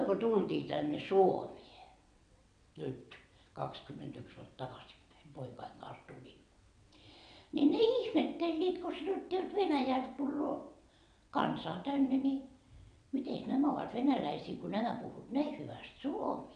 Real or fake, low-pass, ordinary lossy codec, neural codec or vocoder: fake; 9.9 kHz; none; vocoder, 22.05 kHz, 80 mel bands, WaveNeXt